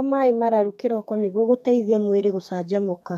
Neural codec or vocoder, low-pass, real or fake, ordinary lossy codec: codec, 32 kHz, 1.9 kbps, SNAC; 14.4 kHz; fake; MP3, 96 kbps